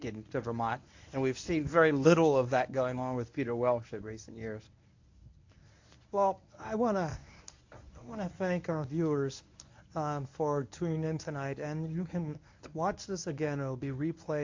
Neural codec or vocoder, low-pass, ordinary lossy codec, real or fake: codec, 24 kHz, 0.9 kbps, WavTokenizer, medium speech release version 1; 7.2 kHz; AAC, 48 kbps; fake